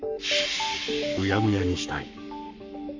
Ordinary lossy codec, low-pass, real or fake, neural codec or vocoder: MP3, 64 kbps; 7.2 kHz; fake; codec, 44.1 kHz, 7.8 kbps, Pupu-Codec